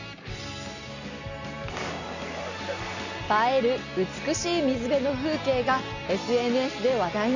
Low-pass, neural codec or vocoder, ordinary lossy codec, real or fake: 7.2 kHz; none; none; real